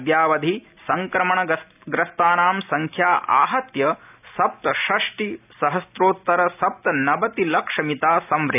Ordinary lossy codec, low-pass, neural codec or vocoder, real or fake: none; 3.6 kHz; none; real